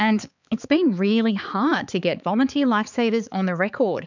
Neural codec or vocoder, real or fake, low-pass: codec, 16 kHz, 4 kbps, X-Codec, HuBERT features, trained on balanced general audio; fake; 7.2 kHz